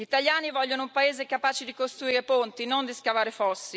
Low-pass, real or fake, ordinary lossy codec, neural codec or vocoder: none; real; none; none